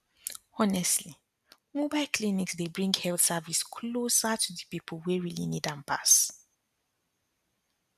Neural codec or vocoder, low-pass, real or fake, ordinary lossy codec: none; 14.4 kHz; real; none